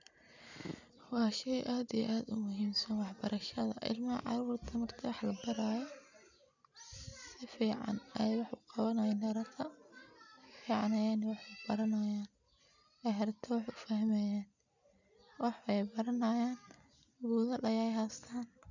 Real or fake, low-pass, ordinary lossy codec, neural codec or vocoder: real; 7.2 kHz; none; none